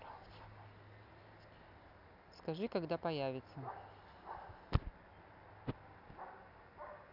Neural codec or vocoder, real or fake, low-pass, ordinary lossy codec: none; real; 5.4 kHz; none